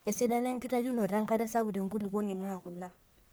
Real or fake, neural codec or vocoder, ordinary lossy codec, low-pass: fake; codec, 44.1 kHz, 1.7 kbps, Pupu-Codec; none; none